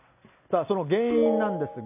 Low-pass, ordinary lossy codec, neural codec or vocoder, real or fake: 3.6 kHz; none; none; real